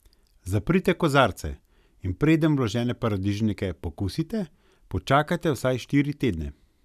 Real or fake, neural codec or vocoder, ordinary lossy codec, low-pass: real; none; none; 14.4 kHz